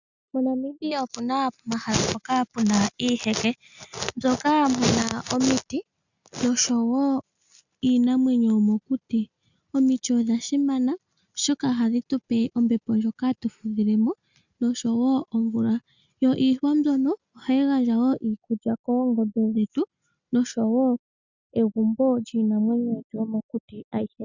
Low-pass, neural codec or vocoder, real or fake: 7.2 kHz; none; real